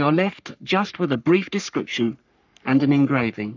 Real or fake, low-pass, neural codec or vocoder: fake; 7.2 kHz; codec, 44.1 kHz, 3.4 kbps, Pupu-Codec